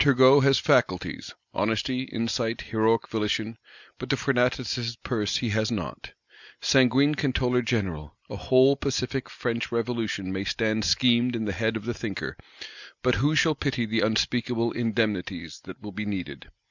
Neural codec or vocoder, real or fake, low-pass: none; real; 7.2 kHz